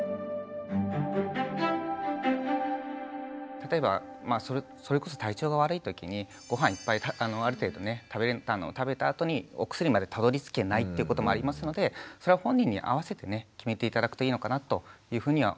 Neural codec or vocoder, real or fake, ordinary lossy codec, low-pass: none; real; none; none